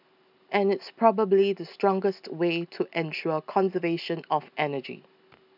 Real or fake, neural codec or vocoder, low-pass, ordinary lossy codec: real; none; 5.4 kHz; none